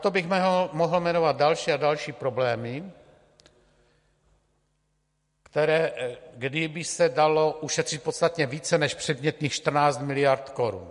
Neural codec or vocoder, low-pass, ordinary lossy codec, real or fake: none; 14.4 kHz; MP3, 48 kbps; real